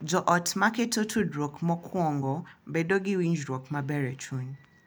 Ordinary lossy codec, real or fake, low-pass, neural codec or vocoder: none; real; none; none